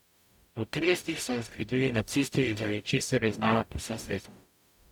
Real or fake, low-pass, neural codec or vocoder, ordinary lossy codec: fake; 19.8 kHz; codec, 44.1 kHz, 0.9 kbps, DAC; none